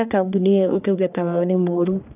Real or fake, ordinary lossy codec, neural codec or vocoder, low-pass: fake; none; codec, 44.1 kHz, 1.7 kbps, Pupu-Codec; 3.6 kHz